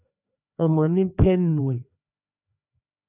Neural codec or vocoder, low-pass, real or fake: codec, 16 kHz, 2 kbps, FreqCodec, larger model; 3.6 kHz; fake